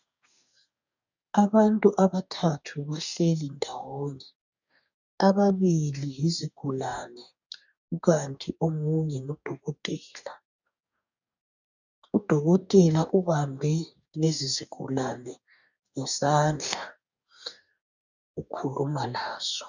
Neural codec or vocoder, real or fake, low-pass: codec, 44.1 kHz, 2.6 kbps, DAC; fake; 7.2 kHz